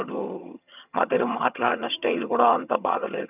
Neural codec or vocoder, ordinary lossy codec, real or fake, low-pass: vocoder, 22.05 kHz, 80 mel bands, HiFi-GAN; none; fake; 3.6 kHz